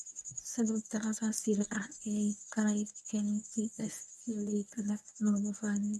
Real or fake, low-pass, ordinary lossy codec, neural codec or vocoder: fake; none; none; codec, 24 kHz, 0.9 kbps, WavTokenizer, medium speech release version 1